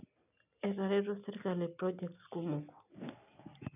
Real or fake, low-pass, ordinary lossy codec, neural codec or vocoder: real; 3.6 kHz; none; none